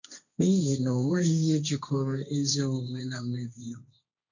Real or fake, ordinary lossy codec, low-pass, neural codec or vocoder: fake; none; 7.2 kHz; codec, 16 kHz, 1.1 kbps, Voila-Tokenizer